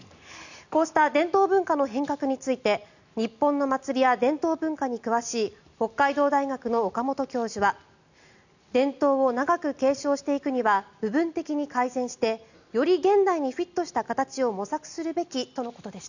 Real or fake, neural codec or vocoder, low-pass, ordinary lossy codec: real; none; 7.2 kHz; none